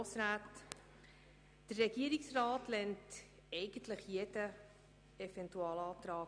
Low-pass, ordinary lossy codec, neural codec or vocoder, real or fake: 9.9 kHz; none; none; real